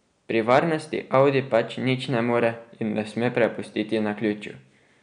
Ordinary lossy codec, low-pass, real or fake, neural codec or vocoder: none; 9.9 kHz; real; none